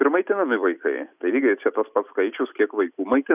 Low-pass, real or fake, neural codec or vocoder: 3.6 kHz; real; none